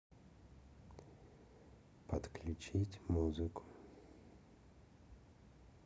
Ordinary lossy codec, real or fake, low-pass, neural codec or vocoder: none; real; none; none